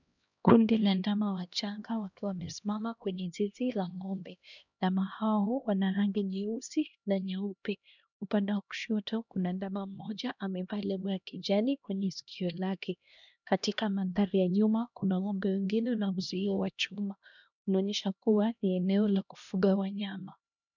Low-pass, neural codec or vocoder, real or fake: 7.2 kHz; codec, 16 kHz, 1 kbps, X-Codec, HuBERT features, trained on LibriSpeech; fake